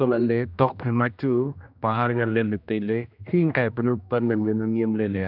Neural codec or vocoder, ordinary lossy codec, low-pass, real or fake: codec, 16 kHz, 1 kbps, X-Codec, HuBERT features, trained on general audio; none; 5.4 kHz; fake